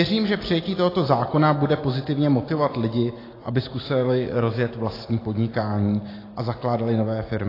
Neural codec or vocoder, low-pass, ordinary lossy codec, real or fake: none; 5.4 kHz; AAC, 24 kbps; real